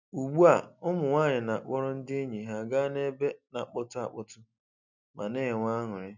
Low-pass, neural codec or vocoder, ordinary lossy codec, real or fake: 7.2 kHz; none; none; real